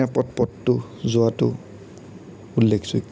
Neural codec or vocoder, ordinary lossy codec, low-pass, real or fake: none; none; none; real